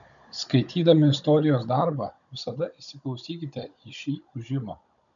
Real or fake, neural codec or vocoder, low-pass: fake; codec, 16 kHz, 16 kbps, FunCodec, trained on Chinese and English, 50 frames a second; 7.2 kHz